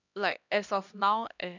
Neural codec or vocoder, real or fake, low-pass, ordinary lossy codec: codec, 16 kHz, 1 kbps, X-Codec, HuBERT features, trained on LibriSpeech; fake; 7.2 kHz; none